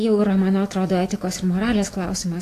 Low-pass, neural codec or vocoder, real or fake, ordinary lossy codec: 14.4 kHz; autoencoder, 48 kHz, 128 numbers a frame, DAC-VAE, trained on Japanese speech; fake; AAC, 48 kbps